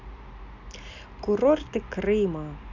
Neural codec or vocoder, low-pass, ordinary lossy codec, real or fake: none; 7.2 kHz; none; real